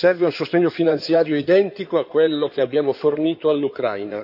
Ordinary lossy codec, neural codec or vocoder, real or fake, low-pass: none; codec, 16 kHz in and 24 kHz out, 2.2 kbps, FireRedTTS-2 codec; fake; 5.4 kHz